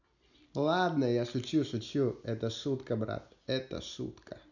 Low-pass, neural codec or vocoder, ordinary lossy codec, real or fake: 7.2 kHz; none; none; real